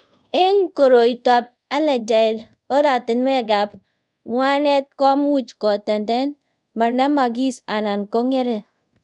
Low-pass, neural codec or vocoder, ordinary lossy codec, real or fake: 10.8 kHz; codec, 24 kHz, 0.5 kbps, DualCodec; none; fake